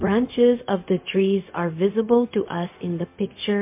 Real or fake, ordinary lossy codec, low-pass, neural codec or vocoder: fake; MP3, 24 kbps; 3.6 kHz; codec, 16 kHz, 0.4 kbps, LongCat-Audio-Codec